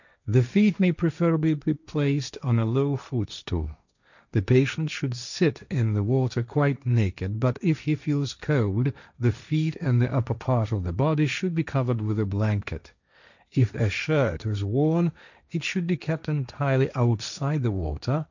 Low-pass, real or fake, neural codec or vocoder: 7.2 kHz; fake; codec, 16 kHz, 1.1 kbps, Voila-Tokenizer